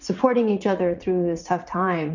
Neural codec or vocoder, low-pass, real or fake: vocoder, 44.1 kHz, 80 mel bands, Vocos; 7.2 kHz; fake